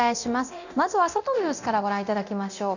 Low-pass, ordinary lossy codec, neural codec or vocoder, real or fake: 7.2 kHz; none; codec, 24 kHz, 0.9 kbps, DualCodec; fake